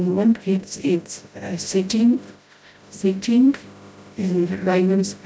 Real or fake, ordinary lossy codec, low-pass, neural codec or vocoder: fake; none; none; codec, 16 kHz, 0.5 kbps, FreqCodec, smaller model